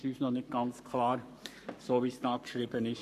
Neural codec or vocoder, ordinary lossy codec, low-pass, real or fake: codec, 44.1 kHz, 7.8 kbps, Pupu-Codec; none; 14.4 kHz; fake